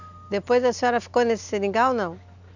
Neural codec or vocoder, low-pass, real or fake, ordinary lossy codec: none; 7.2 kHz; real; none